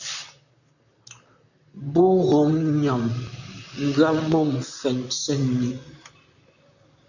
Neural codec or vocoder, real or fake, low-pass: vocoder, 44.1 kHz, 128 mel bands, Pupu-Vocoder; fake; 7.2 kHz